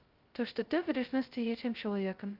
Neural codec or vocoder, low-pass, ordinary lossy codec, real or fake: codec, 16 kHz, 0.2 kbps, FocalCodec; 5.4 kHz; Opus, 32 kbps; fake